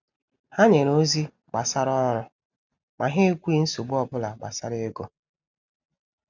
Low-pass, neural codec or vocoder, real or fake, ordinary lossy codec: 7.2 kHz; none; real; none